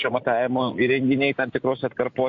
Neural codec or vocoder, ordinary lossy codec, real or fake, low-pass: none; AAC, 48 kbps; real; 7.2 kHz